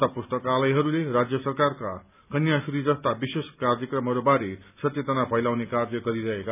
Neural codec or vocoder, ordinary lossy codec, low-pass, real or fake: none; none; 3.6 kHz; real